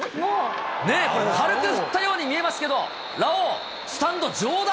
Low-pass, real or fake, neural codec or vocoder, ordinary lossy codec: none; real; none; none